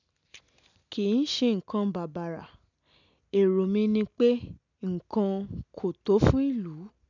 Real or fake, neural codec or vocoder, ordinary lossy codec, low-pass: real; none; none; 7.2 kHz